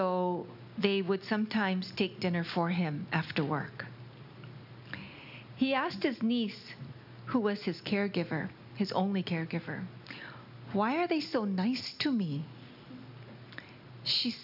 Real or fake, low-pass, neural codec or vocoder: real; 5.4 kHz; none